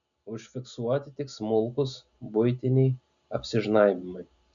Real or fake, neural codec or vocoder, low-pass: real; none; 7.2 kHz